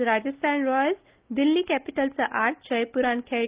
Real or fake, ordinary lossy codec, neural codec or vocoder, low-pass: real; Opus, 32 kbps; none; 3.6 kHz